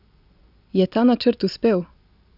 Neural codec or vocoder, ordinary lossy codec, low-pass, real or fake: none; none; 5.4 kHz; real